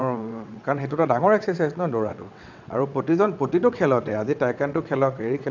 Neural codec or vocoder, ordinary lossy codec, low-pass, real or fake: vocoder, 22.05 kHz, 80 mel bands, WaveNeXt; none; 7.2 kHz; fake